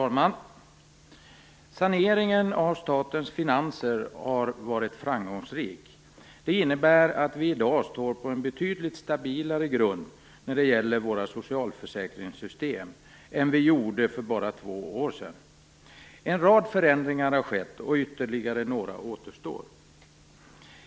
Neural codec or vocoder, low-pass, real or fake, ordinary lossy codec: none; none; real; none